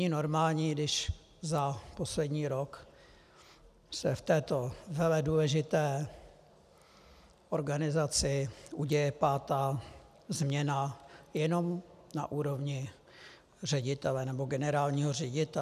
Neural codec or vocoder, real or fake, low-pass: none; real; 14.4 kHz